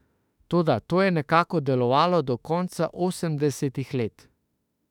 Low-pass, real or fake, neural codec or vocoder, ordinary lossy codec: 19.8 kHz; fake; autoencoder, 48 kHz, 32 numbers a frame, DAC-VAE, trained on Japanese speech; none